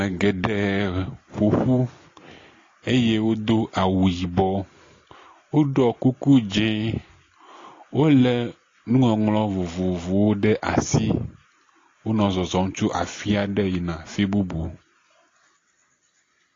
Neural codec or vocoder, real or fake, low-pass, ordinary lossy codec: none; real; 7.2 kHz; AAC, 32 kbps